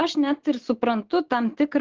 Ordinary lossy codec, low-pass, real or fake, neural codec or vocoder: Opus, 16 kbps; 7.2 kHz; real; none